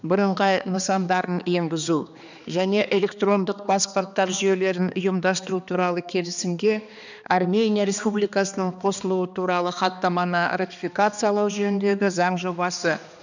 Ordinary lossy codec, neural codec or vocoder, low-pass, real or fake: none; codec, 16 kHz, 2 kbps, X-Codec, HuBERT features, trained on balanced general audio; 7.2 kHz; fake